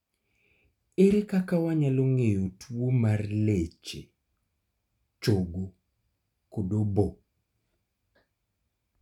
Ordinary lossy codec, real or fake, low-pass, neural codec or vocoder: none; real; 19.8 kHz; none